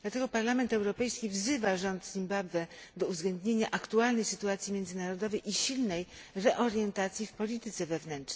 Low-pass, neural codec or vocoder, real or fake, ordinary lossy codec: none; none; real; none